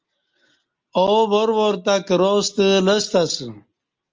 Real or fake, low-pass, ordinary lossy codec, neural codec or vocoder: real; 7.2 kHz; Opus, 32 kbps; none